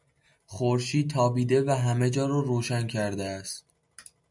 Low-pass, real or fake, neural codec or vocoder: 10.8 kHz; real; none